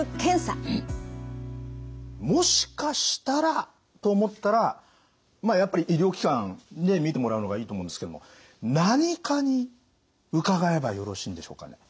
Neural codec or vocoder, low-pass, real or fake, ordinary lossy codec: none; none; real; none